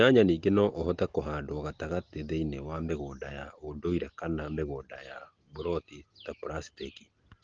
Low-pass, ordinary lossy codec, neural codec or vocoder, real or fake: 7.2 kHz; Opus, 16 kbps; none; real